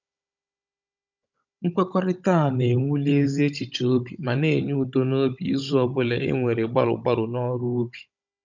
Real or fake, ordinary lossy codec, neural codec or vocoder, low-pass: fake; none; codec, 16 kHz, 16 kbps, FunCodec, trained on Chinese and English, 50 frames a second; 7.2 kHz